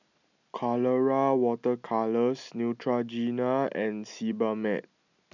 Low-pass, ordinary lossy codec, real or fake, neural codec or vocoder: 7.2 kHz; none; real; none